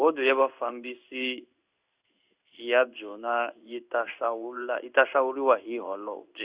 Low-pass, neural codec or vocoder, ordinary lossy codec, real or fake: 3.6 kHz; codec, 16 kHz in and 24 kHz out, 1 kbps, XY-Tokenizer; Opus, 64 kbps; fake